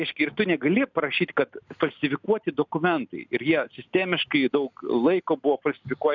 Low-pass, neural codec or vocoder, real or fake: 7.2 kHz; none; real